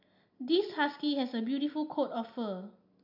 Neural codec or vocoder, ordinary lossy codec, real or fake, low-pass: none; none; real; 5.4 kHz